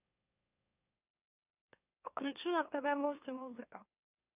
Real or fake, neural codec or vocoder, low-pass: fake; autoencoder, 44.1 kHz, a latent of 192 numbers a frame, MeloTTS; 3.6 kHz